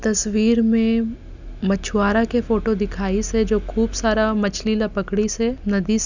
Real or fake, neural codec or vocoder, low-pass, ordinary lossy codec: real; none; 7.2 kHz; none